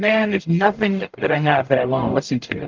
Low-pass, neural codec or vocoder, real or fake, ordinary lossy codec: 7.2 kHz; codec, 44.1 kHz, 0.9 kbps, DAC; fake; Opus, 32 kbps